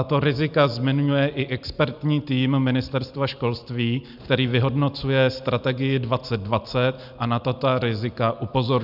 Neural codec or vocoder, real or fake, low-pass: none; real; 5.4 kHz